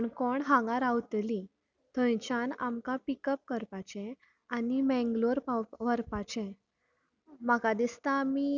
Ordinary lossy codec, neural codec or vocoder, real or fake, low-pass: none; none; real; 7.2 kHz